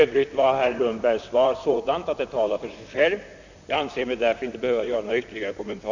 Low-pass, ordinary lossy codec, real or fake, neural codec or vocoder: 7.2 kHz; none; fake; vocoder, 44.1 kHz, 128 mel bands, Pupu-Vocoder